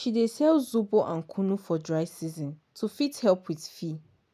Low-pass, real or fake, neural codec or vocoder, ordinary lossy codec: 14.4 kHz; real; none; none